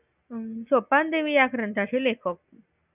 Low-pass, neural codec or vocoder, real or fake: 3.6 kHz; none; real